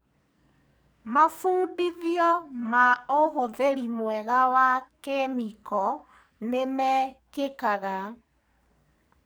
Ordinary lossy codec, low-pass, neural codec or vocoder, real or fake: none; none; codec, 44.1 kHz, 2.6 kbps, SNAC; fake